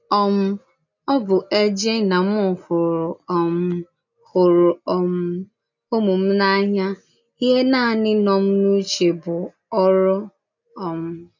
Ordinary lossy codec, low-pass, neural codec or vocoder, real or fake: none; 7.2 kHz; none; real